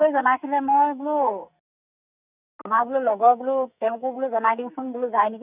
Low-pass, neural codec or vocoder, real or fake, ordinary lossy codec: 3.6 kHz; codec, 44.1 kHz, 2.6 kbps, SNAC; fake; none